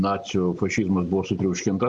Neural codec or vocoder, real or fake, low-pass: none; real; 10.8 kHz